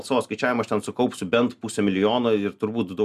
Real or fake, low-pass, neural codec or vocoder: real; 14.4 kHz; none